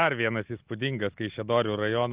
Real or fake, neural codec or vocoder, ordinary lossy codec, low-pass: real; none; Opus, 32 kbps; 3.6 kHz